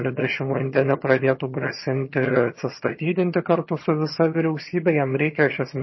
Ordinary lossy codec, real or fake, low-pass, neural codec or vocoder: MP3, 24 kbps; fake; 7.2 kHz; vocoder, 22.05 kHz, 80 mel bands, HiFi-GAN